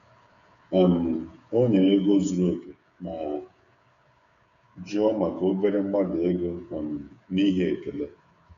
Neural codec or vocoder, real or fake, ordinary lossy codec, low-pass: codec, 16 kHz, 8 kbps, FreqCodec, smaller model; fake; none; 7.2 kHz